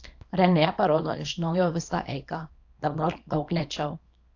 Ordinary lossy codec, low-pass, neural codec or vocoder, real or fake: AAC, 48 kbps; 7.2 kHz; codec, 24 kHz, 0.9 kbps, WavTokenizer, small release; fake